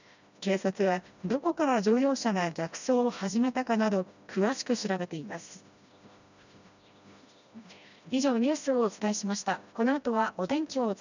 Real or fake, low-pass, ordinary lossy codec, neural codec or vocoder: fake; 7.2 kHz; none; codec, 16 kHz, 1 kbps, FreqCodec, smaller model